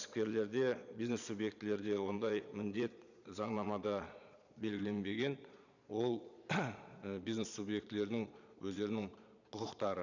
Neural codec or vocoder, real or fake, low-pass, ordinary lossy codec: vocoder, 22.05 kHz, 80 mel bands, Vocos; fake; 7.2 kHz; none